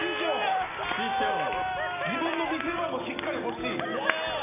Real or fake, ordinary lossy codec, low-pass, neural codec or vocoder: real; none; 3.6 kHz; none